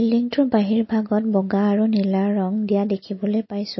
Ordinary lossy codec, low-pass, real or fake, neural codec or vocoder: MP3, 24 kbps; 7.2 kHz; real; none